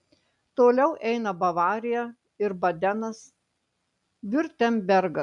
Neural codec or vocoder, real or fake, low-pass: none; real; 10.8 kHz